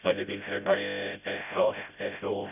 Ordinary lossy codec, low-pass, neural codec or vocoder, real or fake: none; 3.6 kHz; codec, 16 kHz, 0.5 kbps, FreqCodec, smaller model; fake